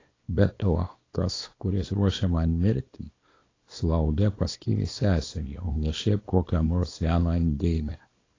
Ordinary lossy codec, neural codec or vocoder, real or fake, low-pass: AAC, 32 kbps; codec, 24 kHz, 0.9 kbps, WavTokenizer, small release; fake; 7.2 kHz